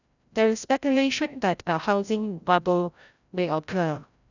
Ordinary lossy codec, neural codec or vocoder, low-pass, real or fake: none; codec, 16 kHz, 0.5 kbps, FreqCodec, larger model; 7.2 kHz; fake